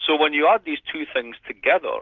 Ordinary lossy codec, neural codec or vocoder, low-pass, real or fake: Opus, 32 kbps; none; 7.2 kHz; real